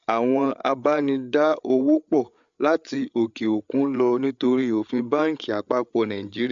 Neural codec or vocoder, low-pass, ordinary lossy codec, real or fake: codec, 16 kHz, 8 kbps, FreqCodec, larger model; 7.2 kHz; MP3, 64 kbps; fake